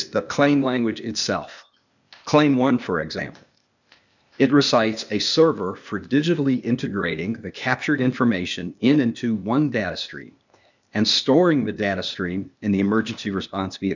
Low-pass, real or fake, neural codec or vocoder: 7.2 kHz; fake; codec, 16 kHz, 0.8 kbps, ZipCodec